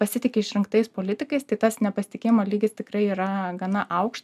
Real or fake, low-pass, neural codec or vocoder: real; 14.4 kHz; none